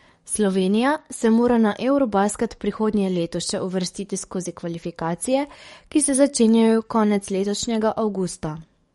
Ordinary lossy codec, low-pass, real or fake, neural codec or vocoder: MP3, 48 kbps; 19.8 kHz; fake; codec, 44.1 kHz, 7.8 kbps, DAC